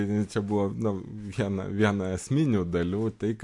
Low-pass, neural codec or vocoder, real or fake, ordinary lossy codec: 10.8 kHz; none; real; MP3, 64 kbps